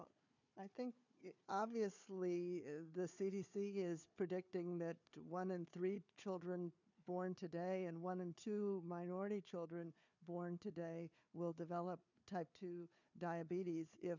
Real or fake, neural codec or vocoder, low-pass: fake; codec, 16 kHz, 4 kbps, FunCodec, trained on Chinese and English, 50 frames a second; 7.2 kHz